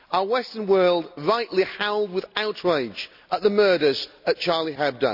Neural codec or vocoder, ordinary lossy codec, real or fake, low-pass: none; none; real; 5.4 kHz